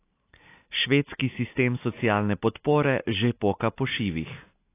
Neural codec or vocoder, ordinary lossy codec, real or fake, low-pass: none; AAC, 24 kbps; real; 3.6 kHz